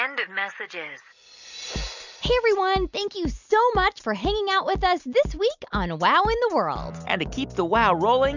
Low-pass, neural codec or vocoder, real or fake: 7.2 kHz; none; real